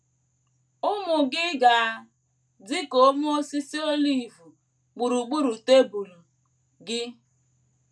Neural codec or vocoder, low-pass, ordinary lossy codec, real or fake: none; none; none; real